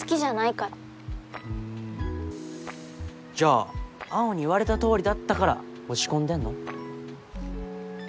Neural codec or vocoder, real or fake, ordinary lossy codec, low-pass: none; real; none; none